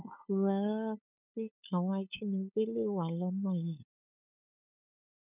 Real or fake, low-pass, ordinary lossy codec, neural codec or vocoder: fake; 3.6 kHz; none; codec, 16 kHz, 8 kbps, FunCodec, trained on LibriTTS, 25 frames a second